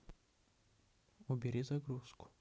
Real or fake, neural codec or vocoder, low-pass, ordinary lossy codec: real; none; none; none